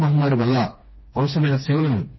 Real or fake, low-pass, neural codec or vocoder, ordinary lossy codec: fake; 7.2 kHz; codec, 16 kHz, 2 kbps, FreqCodec, smaller model; MP3, 24 kbps